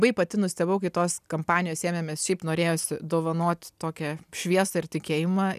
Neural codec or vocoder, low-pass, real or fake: none; 14.4 kHz; real